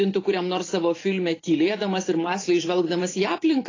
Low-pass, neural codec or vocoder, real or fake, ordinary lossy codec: 7.2 kHz; vocoder, 44.1 kHz, 128 mel bands every 512 samples, BigVGAN v2; fake; AAC, 32 kbps